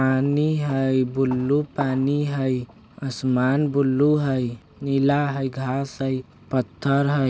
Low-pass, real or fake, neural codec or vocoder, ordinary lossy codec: none; real; none; none